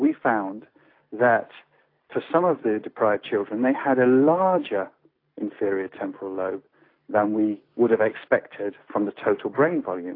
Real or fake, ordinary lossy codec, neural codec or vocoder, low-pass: real; AAC, 32 kbps; none; 5.4 kHz